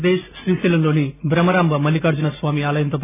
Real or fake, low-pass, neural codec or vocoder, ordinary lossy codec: real; 3.6 kHz; none; none